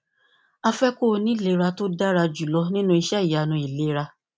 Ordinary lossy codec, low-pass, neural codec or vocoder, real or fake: none; none; none; real